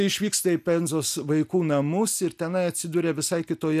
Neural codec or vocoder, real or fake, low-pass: none; real; 14.4 kHz